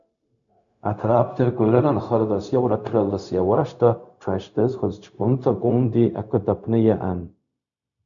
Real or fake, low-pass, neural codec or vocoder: fake; 7.2 kHz; codec, 16 kHz, 0.4 kbps, LongCat-Audio-Codec